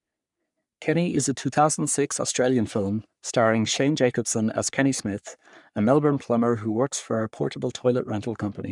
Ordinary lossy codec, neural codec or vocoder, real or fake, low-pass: none; codec, 44.1 kHz, 3.4 kbps, Pupu-Codec; fake; 10.8 kHz